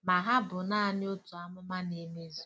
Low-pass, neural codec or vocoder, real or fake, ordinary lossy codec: none; none; real; none